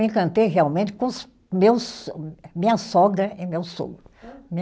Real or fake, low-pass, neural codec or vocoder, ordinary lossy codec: real; none; none; none